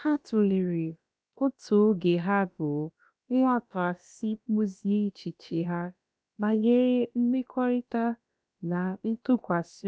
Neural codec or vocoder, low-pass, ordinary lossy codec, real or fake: codec, 16 kHz, about 1 kbps, DyCAST, with the encoder's durations; none; none; fake